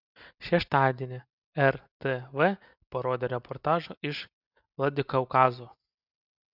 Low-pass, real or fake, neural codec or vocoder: 5.4 kHz; real; none